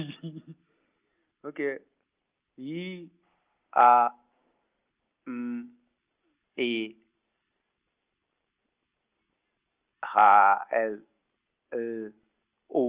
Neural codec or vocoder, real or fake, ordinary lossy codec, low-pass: none; real; Opus, 24 kbps; 3.6 kHz